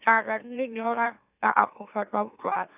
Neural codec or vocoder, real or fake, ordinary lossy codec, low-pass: autoencoder, 44.1 kHz, a latent of 192 numbers a frame, MeloTTS; fake; none; 3.6 kHz